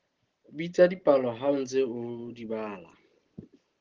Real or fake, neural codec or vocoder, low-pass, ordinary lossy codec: real; none; 7.2 kHz; Opus, 16 kbps